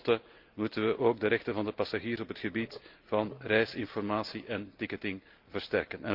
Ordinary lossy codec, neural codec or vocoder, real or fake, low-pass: Opus, 32 kbps; none; real; 5.4 kHz